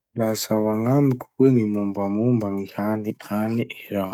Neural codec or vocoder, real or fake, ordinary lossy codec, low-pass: codec, 44.1 kHz, 7.8 kbps, DAC; fake; none; 19.8 kHz